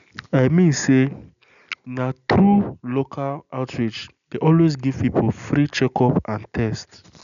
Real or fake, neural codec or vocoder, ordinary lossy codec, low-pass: real; none; none; 7.2 kHz